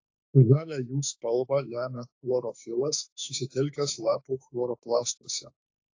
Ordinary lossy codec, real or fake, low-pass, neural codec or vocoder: AAC, 48 kbps; fake; 7.2 kHz; autoencoder, 48 kHz, 32 numbers a frame, DAC-VAE, trained on Japanese speech